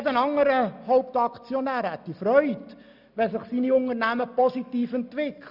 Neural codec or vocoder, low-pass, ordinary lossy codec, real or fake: none; 5.4 kHz; none; real